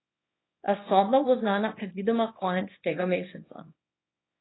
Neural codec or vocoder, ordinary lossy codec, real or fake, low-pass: codec, 24 kHz, 0.9 kbps, WavTokenizer, small release; AAC, 16 kbps; fake; 7.2 kHz